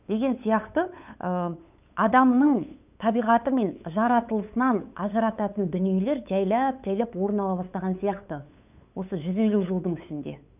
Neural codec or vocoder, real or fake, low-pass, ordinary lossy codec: codec, 16 kHz, 8 kbps, FunCodec, trained on LibriTTS, 25 frames a second; fake; 3.6 kHz; none